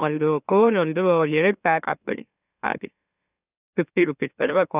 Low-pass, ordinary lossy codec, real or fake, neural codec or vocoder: 3.6 kHz; none; fake; autoencoder, 44.1 kHz, a latent of 192 numbers a frame, MeloTTS